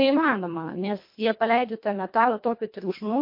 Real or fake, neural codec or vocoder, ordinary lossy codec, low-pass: fake; codec, 24 kHz, 1.5 kbps, HILCodec; MP3, 32 kbps; 5.4 kHz